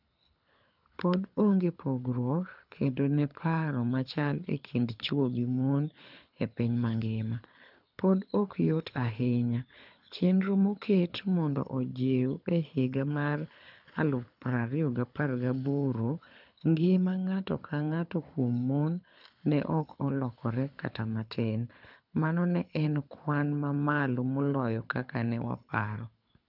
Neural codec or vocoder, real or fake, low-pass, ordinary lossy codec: codec, 24 kHz, 6 kbps, HILCodec; fake; 5.4 kHz; none